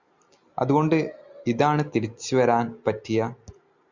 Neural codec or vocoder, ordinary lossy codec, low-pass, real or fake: none; Opus, 64 kbps; 7.2 kHz; real